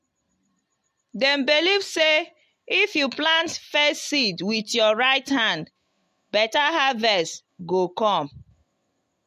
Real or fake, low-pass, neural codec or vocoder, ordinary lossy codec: real; 14.4 kHz; none; MP3, 64 kbps